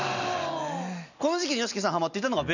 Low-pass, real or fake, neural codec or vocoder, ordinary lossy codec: 7.2 kHz; real; none; none